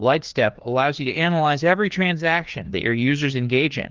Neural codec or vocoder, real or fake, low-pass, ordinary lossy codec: codec, 16 kHz, 2 kbps, FreqCodec, larger model; fake; 7.2 kHz; Opus, 32 kbps